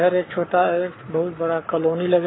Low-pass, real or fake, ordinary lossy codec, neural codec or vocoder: 7.2 kHz; fake; AAC, 16 kbps; codec, 44.1 kHz, 7.8 kbps, Pupu-Codec